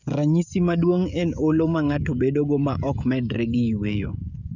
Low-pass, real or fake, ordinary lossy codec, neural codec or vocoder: 7.2 kHz; fake; none; codec, 16 kHz, 16 kbps, FreqCodec, smaller model